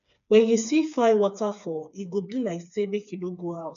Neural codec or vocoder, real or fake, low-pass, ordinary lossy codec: codec, 16 kHz, 4 kbps, FreqCodec, smaller model; fake; 7.2 kHz; MP3, 96 kbps